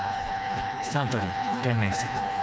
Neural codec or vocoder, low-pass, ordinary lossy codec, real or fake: codec, 16 kHz, 2 kbps, FreqCodec, smaller model; none; none; fake